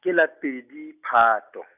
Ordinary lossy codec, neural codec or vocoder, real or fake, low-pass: none; none; real; 3.6 kHz